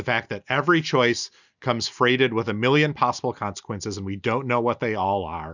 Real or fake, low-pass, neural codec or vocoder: real; 7.2 kHz; none